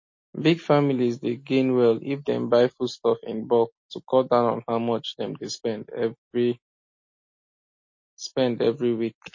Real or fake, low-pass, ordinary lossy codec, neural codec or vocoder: real; 7.2 kHz; MP3, 32 kbps; none